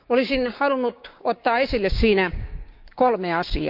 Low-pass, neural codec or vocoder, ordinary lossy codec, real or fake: 5.4 kHz; codec, 16 kHz, 6 kbps, DAC; none; fake